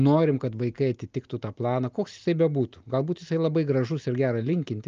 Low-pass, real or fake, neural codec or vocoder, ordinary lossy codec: 7.2 kHz; real; none; Opus, 24 kbps